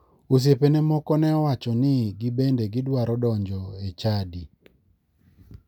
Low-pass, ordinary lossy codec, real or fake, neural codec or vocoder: 19.8 kHz; none; real; none